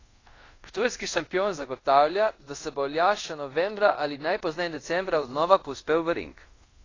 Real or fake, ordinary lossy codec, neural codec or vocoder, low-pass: fake; AAC, 32 kbps; codec, 24 kHz, 0.5 kbps, DualCodec; 7.2 kHz